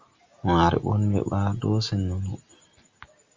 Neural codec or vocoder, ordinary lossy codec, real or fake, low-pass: none; Opus, 32 kbps; real; 7.2 kHz